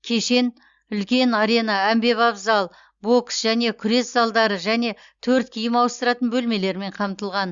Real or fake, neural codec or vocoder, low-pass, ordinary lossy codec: real; none; 7.2 kHz; Opus, 64 kbps